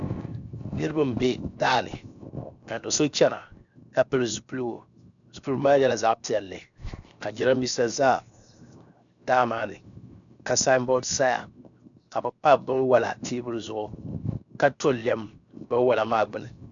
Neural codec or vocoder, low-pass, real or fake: codec, 16 kHz, 0.7 kbps, FocalCodec; 7.2 kHz; fake